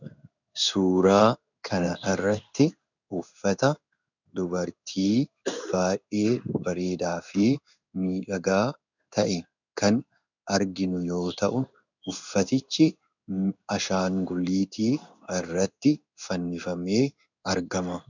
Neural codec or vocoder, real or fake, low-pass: codec, 16 kHz in and 24 kHz out, 1 kbps, XY-Tokenizer; fake; 7.2 kHz